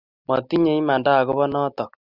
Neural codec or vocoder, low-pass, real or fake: none; 5.4 kHz; real